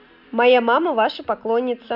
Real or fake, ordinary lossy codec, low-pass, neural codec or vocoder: real; none; 5.4 kHz; none